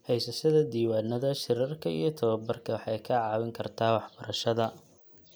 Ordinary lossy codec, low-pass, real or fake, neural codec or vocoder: none; none; real; none